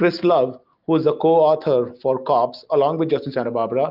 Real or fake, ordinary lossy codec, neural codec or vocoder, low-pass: real; Opus, 32 kbps; none; 5.4 kHz